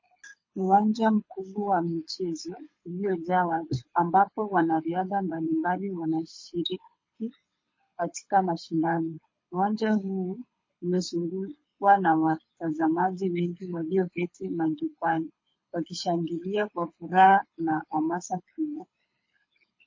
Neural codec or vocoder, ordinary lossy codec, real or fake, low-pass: codec, 24 kHz, 6 kbps, HILCodec; MP3, 32 kbps; fake; 7.2 kHz